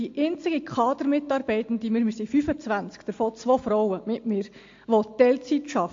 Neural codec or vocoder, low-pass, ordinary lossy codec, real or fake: none; 7.2 kHz; AAC, 48 kbps; real